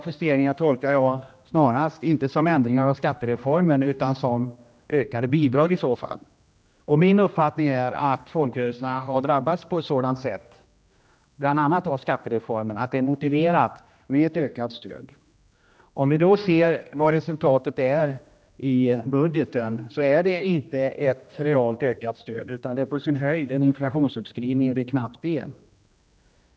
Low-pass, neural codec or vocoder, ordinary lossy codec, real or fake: none; codec, 16 kHz, 1 kbps, X-Codec, HuBERT features, trained on general audio; none; fake